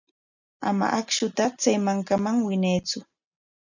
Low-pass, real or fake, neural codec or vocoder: 7.2 kHz; real; none